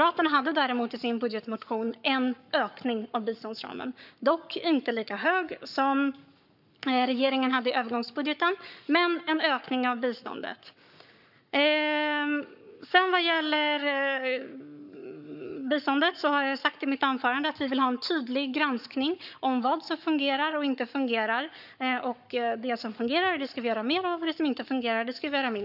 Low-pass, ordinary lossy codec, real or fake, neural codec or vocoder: 5.4 kHz; none; fake; codec, 44.1 kHz, 7.8 kbps, Pupu-Codec